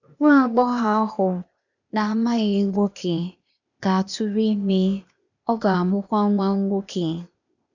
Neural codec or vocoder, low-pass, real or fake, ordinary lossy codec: codec, 16 kHz, 0.8 kbps, ZipCodec; 7.2 kHz; fake; none